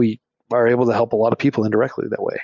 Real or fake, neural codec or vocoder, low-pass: real; none; 7.2 kHz